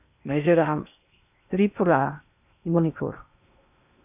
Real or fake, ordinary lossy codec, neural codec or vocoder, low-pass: fake; none; codec, 16 kHz in and 24 kHz out, 0.6 kbps, FocalCodec, streaming, 2048 codes; 3.6 kHz